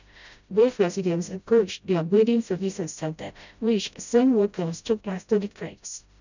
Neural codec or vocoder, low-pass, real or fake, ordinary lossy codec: codec, 16 kHz, 0.5 kbps, FreqCodec, smaller model; 7.2 kHz; fake; none